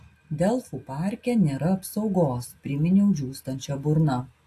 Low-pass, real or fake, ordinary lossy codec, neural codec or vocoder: 14.4 kHz; real; AAC, 64 kbps; none